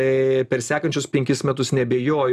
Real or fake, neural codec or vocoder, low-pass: real; none; 14.4 kHz